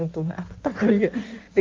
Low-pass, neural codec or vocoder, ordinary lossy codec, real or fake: 7.2 kHz; codec, 16 kHz in and 24 kHz out, 1.1 kbps, FireRedTTS-2 codec; Opus, 32 kbps; fake